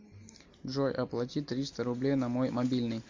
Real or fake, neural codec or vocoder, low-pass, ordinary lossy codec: real; none; 7.2 kHz; MP3, 48 kbps